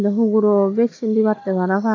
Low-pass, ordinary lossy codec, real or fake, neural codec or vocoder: 7.2 kHz; AAC, 32 kbps; real; none